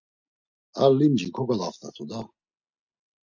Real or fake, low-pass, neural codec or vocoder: real; 7.2 kHz; none